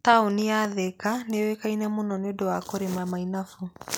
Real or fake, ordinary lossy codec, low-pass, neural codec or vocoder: real; none; none; none